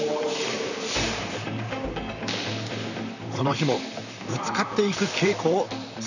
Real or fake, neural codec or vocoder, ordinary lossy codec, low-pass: fake; vocoder, 44.1 kHz, 128 mel bands, Pupu-Vocoder; none; 7.2 kHz